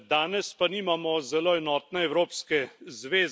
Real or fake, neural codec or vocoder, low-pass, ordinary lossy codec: real; none; none; none